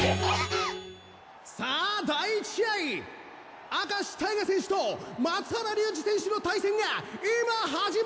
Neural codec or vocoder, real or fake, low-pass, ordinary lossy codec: none; real; none; none